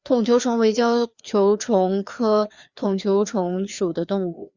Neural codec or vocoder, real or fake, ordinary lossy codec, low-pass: codec, 16 kHz, 2 kbps, FreqCodec, larger model; fake; Opus, 64 kbps; 7.2 kHz